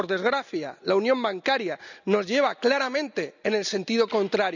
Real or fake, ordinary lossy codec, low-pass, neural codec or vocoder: real; none; 7.2 kHz; none